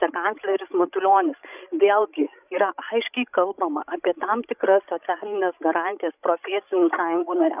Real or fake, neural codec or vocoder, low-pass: fake; codec, 16 kHz, 16 kbps, FreqCodec, larger model; 3.6 kHz